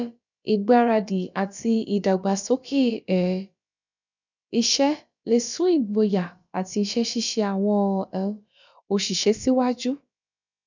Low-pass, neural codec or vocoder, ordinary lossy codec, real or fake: 7.2 kHz; codec, 16 kHz, about 1 kbps, DyCAST, with the encoder's durations; none; fake